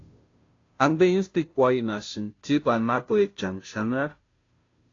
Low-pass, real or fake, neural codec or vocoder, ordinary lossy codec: 7.2 kHz; fake; codec, 16 kHz, 0.5 kbps, FunCodec, trained on Chinese and English, 25 frames a second; AAC, 32 kbps